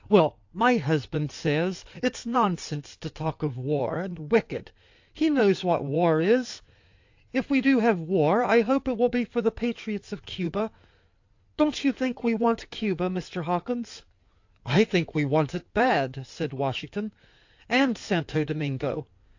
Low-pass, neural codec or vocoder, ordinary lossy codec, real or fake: 7.2 kHz; codec, 16 kHz in and 24 kHz out, 2.2 kbps, FireRedTTS-2 codec; AAC, 48 kbps; fake